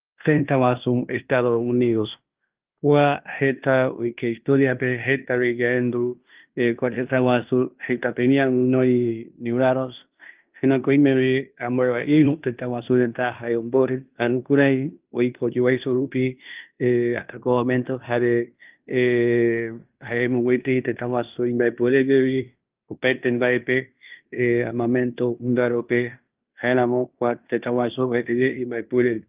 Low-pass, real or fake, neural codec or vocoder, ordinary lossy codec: 3.6 kHz; fake; codec, 16 kHz in and 24 kHz out, 0.9 kbps, LongCat-Audio-Codec, fine tuned four codebook decoder; Opus, 24 kbps